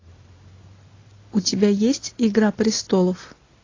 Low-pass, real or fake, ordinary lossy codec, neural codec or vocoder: 7.2 kHz; real; AAC, 32 kbps; none